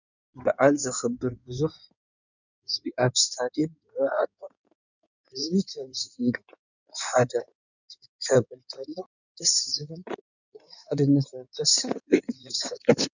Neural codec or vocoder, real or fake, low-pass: codec, 16 kHz in and 24 kHz out, 2.2 kbps, FireRedTTS-2 codec; fake; 7.2 kHz